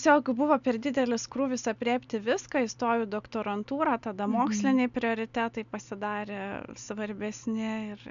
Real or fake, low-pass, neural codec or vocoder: real; 7.2 kHz; none